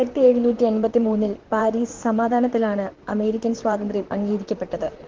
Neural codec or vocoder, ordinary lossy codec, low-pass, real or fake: vocoder, 44.1 kHz, 128 mel bands, Pupu-Vocoder; Opus, 16 kbps; 7.2 kHz; fake